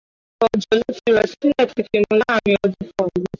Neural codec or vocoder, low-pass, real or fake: none; 7.2 kHz; real